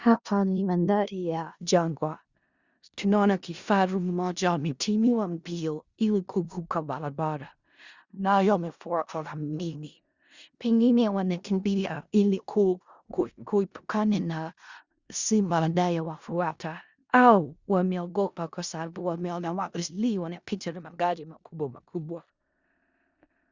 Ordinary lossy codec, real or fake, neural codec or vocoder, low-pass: Opus, 64 kbps; fake; codec, 16 kHz in and 24 kHz out, 0.4 kbps, LongCat-Audio-Codec, four codebook decoder; 7.2 kHz